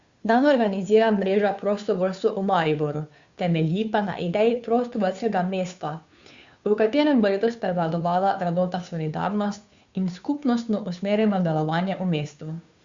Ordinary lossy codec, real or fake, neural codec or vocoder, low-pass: Opus, 64 kbps; fake; codec, 16 kHz, 2 kbps, FunCodec, trained on Chinese and English, 25 frames a second; 7.2 kHz